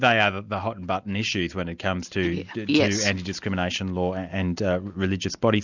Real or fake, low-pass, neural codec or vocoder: real; 7.2 kHz; none